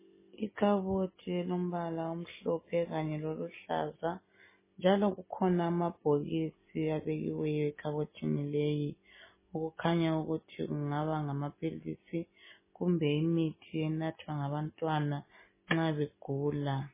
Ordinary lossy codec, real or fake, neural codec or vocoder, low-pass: MP3, 16 kbps; real; none; 3.6 kHz